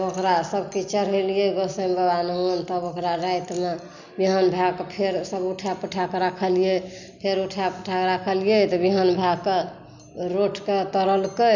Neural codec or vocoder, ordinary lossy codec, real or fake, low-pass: none; none; real; 7.2 kHz